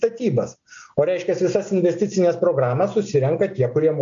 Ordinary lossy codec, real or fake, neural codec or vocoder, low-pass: MP3, 64 kbps; real; none; 7.2 kHz